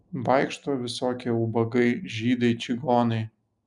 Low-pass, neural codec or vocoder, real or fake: 10.8 kHz; none; real